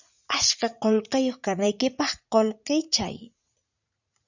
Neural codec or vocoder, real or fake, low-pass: vocoder, 44.1 kHz, 80 mel bands, Vocos; fake; 7.2 kHz